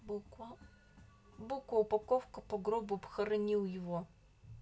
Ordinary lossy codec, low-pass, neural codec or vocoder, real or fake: none; none; none; real